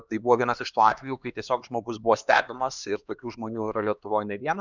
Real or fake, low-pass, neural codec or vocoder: fake; 7.2 kHz; codec, 16 kHz, 2 kbps, X-Codec, HuBERT features, trained on LibriSpeech